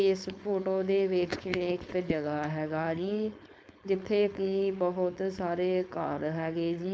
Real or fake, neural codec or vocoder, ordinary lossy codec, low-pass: fake; codec, 16 kHz, 4.8 kbps, FACodec; none; none